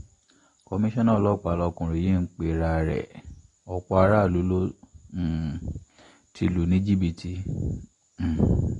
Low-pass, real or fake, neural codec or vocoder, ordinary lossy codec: 10.8 kHz; real; none; AAC, 32 kbps